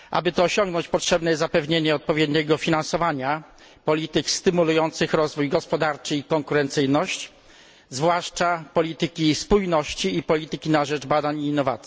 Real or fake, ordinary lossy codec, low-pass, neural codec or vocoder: real; none; none; none